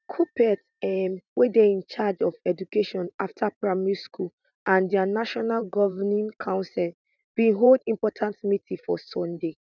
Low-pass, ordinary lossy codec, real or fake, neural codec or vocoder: 7.2 kHz; none; fake; vocoder, 44.1 kHz, 128 mel bands every 512 samples, BigVGAN v2